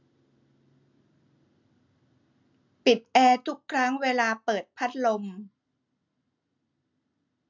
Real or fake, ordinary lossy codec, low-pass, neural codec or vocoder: real; none; 7.2 kHz; none